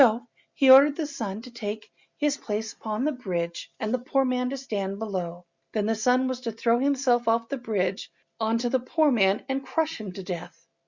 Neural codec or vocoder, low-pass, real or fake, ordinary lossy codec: none; 7.2 kHz; real; Opus, 64 kbps